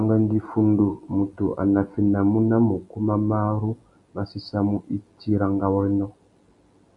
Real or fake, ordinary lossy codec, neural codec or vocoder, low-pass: real; AAC, 64 kbps; none; 10.8 kHz